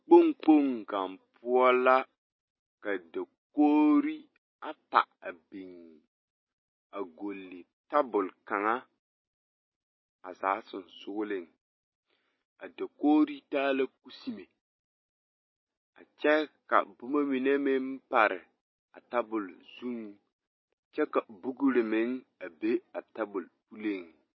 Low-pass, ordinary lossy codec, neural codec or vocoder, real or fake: 7.2 kHz; MP3, 24 kbps; none; real